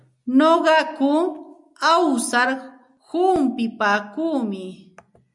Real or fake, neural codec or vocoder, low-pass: real; none; 10.8 kHz